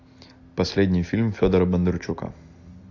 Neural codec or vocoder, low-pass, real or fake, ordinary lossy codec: none; 7.2 kHz; real; AAC, 48 kbps